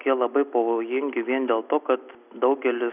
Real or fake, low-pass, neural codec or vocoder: real; 3.6 kHz; none